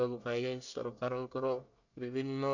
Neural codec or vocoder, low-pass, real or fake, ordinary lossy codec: codec, 24 kHz, 1 kbps, SNAC; 7.2 kHz; fake; none